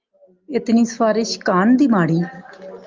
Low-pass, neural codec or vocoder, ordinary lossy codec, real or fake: 7.2 kHz; none; Opus, 32 kbps; real